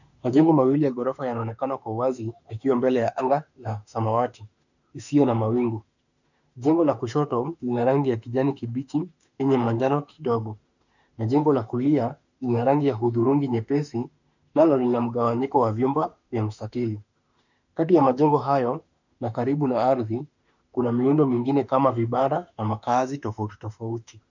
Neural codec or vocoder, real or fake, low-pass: autoencoder, 48 kHz, 32 numbers a frame, DAC-VAE, trained on Japanese speech; fake; 7.2 kHz